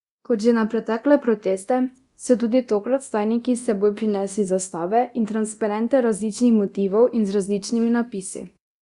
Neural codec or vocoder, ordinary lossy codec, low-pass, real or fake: codec, 24 kHz, 0.9 kbps, DualCodec; Opus, 64 kbps; 10.8 kHz; fake